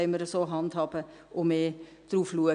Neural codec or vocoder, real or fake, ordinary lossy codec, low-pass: none; real; none; 9.9 kHz